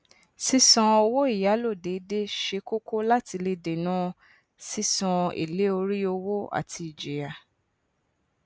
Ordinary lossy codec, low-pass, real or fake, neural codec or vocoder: none; none; real; none